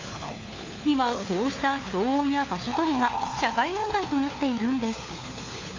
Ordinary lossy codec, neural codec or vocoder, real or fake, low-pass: AAC, 32 kbps; codec, 16 kHz, 4 kbps, FunCodec, trained on LibriTTS, 50 frames a second; fake; 7.2 kHz